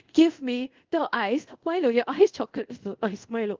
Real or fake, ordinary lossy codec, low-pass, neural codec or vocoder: fake; Opus, 32 kbps; 7.2 kHz; codec, 24 kHz, 0.5 kbps, DualCodec